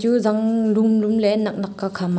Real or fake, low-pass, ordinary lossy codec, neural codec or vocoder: real; none; none; none